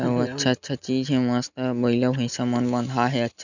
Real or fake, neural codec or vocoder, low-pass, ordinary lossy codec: real; none; 7.2 kHz; none